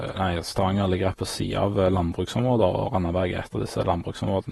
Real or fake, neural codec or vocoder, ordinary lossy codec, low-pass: fake; vocoder, 48 kHz, 128 mel bands, Vocos; AAC, 32 kbps; 19.8 kHz